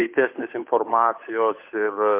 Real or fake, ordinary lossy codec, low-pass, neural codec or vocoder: fake; MP3, 24 kbps; 3.6 kHz; codec, 16 kHz, 8 kbps, FunCodec, trained on Chinese and English, 25 frames a second